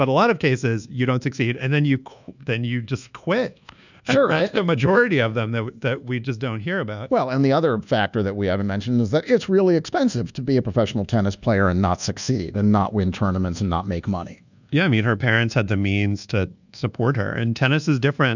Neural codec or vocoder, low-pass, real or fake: codec, 24 kHz, 1.2 kbps, DualCodec; 7.2 kHz; fake